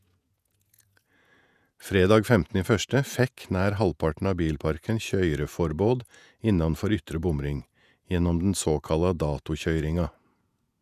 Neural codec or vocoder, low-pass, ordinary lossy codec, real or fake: vocoder, 48 kHz, 128 mel bands, Vocos; 14.4 kHz; none; fake